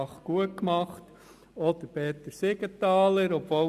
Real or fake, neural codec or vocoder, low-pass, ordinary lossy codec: real; none; 14.4 kHz; Opus, 64 kbps